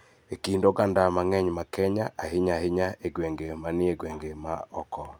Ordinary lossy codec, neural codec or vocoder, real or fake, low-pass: none; none; real; none